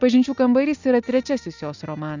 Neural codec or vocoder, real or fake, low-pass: none; real; 7.2 kHz